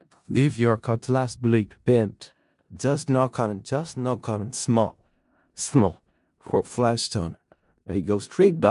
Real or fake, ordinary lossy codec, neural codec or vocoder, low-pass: fake; MP3, 64 kbps; codec, 16 kHz in and 24 kHz out, 0.4 kbps, LongCat-Audio-Codec, four codebook decoder; 10.8 kHz